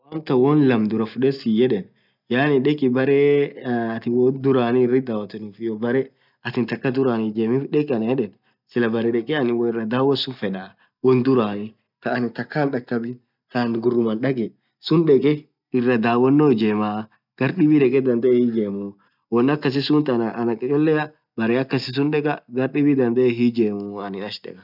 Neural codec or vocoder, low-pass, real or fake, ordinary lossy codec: none; 5.4 kHz; real; none